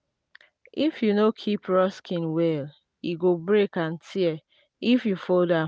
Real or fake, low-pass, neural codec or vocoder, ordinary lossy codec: real; none; none; none